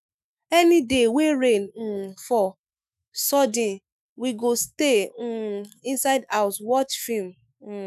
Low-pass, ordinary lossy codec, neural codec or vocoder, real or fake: 14.4 kHz; none; autoencoder, 48 kHz, 128 numbers a frame, DAC-VAE, trained on Japanese speech; fake